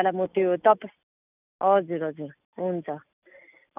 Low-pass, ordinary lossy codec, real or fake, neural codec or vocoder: 3.6 kHz; none; real; none